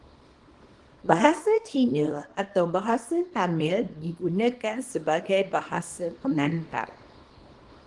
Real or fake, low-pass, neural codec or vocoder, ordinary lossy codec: fake; 10.8 kHz; codec, 24 kHz, 0.9 kbps, WavTokenizer, small release; Opus, 24 kbps